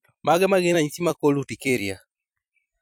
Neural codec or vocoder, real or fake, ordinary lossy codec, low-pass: vocoder, 44.1 kHz, 128 mel bands every 256 samples, BigVGAN v2; fake; none; none